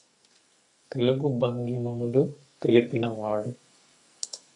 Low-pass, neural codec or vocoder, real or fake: 10.8 kHz; codec, 44.1 kHz, 2.6 kbps, SNAC; fake